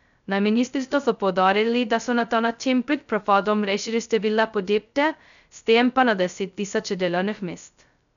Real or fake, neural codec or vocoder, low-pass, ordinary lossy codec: fake; codec, 16 kHz, 0.2 kbps, FocalCodec; 7.2 kHz; none